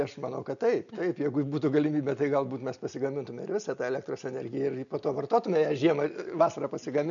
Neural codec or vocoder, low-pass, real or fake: none; 7.2 kHz; real